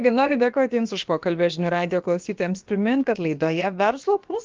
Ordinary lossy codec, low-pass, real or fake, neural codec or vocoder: Opus, 32 kbps; 7.2 kHz; fake; codec, 16 kHz, about 1 kbps, DyCAST, with the encoder's durations